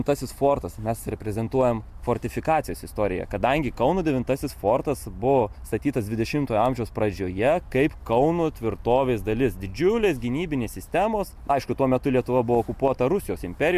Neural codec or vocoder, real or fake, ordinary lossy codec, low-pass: none; real; MP3, 96 kbps; 14.4 kHz